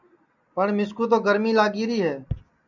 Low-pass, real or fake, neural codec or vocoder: 7.2 kHz; real; none